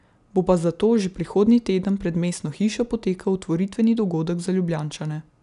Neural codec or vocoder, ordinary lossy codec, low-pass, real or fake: none; none; 10.8 kHz; real